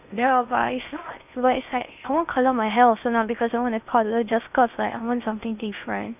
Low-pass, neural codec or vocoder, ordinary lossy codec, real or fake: 3.6 kHz; codec, 16 kHz in and 24 kHz out, 0.6 kbps, FocalCodec, streaming, 4096 codes; none; fake